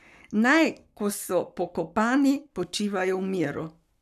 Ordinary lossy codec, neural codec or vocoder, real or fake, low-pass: none; codec, 44.1 kHz, 7.8 kbps, Pupu-Codec; fake; 14.4 kHz